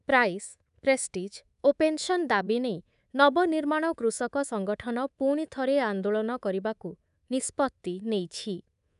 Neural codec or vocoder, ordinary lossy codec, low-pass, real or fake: codec, 24 kHz, 3.1 kbps, DualCodec; none; 10.8 kHz; fake